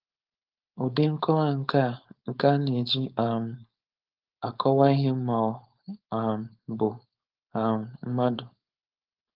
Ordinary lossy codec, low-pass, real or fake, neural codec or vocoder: Opus, 32 kbps; 5.4 kHz; fake; codec, 16 kHz, 4.8 kbps, FACodec